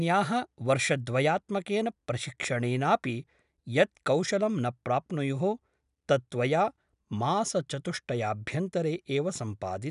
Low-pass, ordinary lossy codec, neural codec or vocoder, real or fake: 10.8 kHz; none; none; real